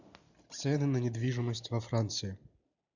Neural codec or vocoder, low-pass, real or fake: none; 7.2 kHz; real